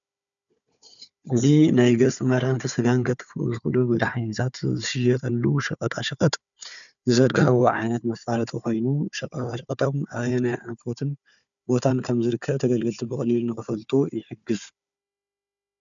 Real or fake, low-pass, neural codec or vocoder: fake; 7.2 kHz; codec, 16 kHz, 4 kbps, FunCodec, trained on Chinese and English, 50 frames a second